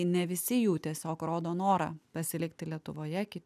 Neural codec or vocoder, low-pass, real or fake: none; 14.4 kHz; real